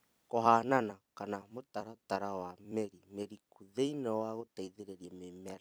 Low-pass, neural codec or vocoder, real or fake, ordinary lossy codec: none; vocoder, 44.1 kHz, 128 mel bands every 256 samples, BigVGAN v2; fake; none